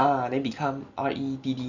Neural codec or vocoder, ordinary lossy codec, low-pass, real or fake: none; none; 7.2 kHz; real